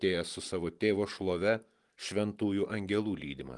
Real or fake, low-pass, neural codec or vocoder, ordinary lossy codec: real; 10.8 kHz; none; Opus, 24 kbps